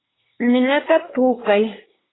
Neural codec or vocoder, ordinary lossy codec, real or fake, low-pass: codec, 24 kHz, 1 kbps, SNAC; AAC, 16 kbps; fake; 7.2 kHz